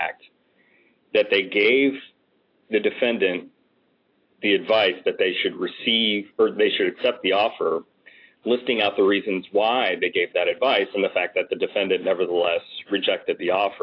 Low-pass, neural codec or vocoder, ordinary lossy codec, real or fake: 5.4 kHz; none; AAC, 32 kbps; real